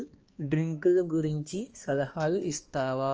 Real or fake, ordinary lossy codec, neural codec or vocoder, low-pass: fake; Opus, 24 kbps; codec, 16 kHz, 2 kbps, X-Codec, HuBERT features, trained on balanced general audio; 7.2 kHz